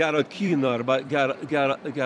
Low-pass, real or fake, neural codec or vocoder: 10.8 kHz; fake; vocoder, 44.1 kHz, 128 mel bands every 256 samples, BigVGAN v2